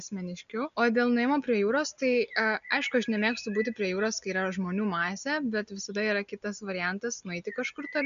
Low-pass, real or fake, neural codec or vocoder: 7.2 kHz; real; none